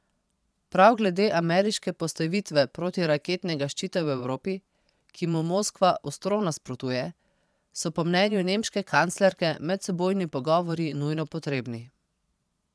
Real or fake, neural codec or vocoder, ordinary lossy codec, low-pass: fake; vocoder, 22.05 kHz, 80 mel bands, Vocos; none; none